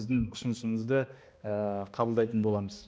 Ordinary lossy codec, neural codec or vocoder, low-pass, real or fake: none; codec, 16 kHz, 1 kbps, X-Codec, HuBERT features, trained on balanced general audio; none; fake